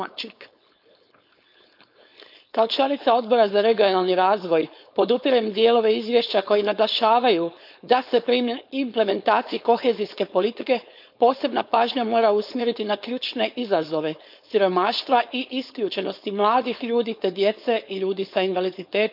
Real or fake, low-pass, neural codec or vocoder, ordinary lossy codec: fake; 5.4 kHz; codec, 16 kHz, 4.8 kbps, FACodec; none